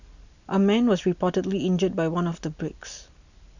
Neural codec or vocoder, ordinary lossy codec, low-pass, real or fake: none; none; 7.2 kHz; real